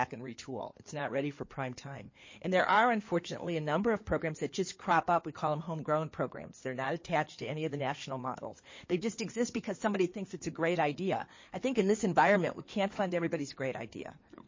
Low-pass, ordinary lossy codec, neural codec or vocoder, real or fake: 7.2 kHz; MP3, 32 kbps; codec, 16 kHz in and 24 kHz out, 2.2 kbps, FireRedTTS-2 codec; fake